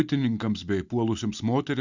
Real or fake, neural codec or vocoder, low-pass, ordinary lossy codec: real; none; 7.2 kHz; Opus, 64 kbps